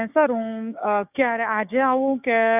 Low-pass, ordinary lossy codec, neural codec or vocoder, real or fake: 3.6 kHz; none; codec, 16 kHz, 6 kbps, DAC; fake